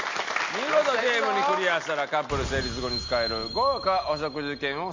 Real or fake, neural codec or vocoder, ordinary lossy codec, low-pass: real; none; MP3, 48 kbps; 7.2 kHz